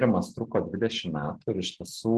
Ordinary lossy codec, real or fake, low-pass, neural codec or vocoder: Opus, 16 kbps; real; 10.8 kHz; none